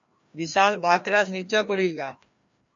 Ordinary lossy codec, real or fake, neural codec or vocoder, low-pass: MP3, 48 kbps; fake; codec, 16 kHz, 1 kbps, FreqCodec, larger model; 7.2 kHz